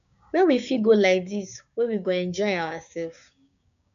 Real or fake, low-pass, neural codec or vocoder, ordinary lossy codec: fake; 7.2 kHz; codec, 16 kHz, 6 kbps, DAC; none